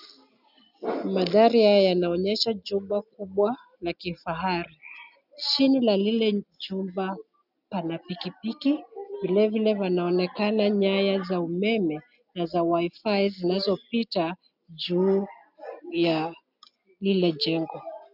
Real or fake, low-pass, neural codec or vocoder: real; 5.4 kHz; none